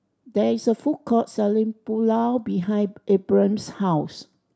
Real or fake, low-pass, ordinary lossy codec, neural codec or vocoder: real; none; none; none